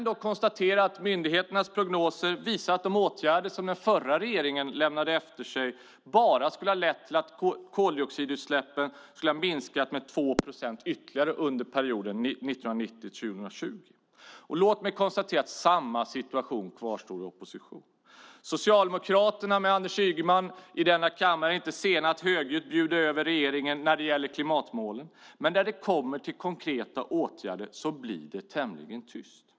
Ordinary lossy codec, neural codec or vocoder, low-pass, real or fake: none; none; none; real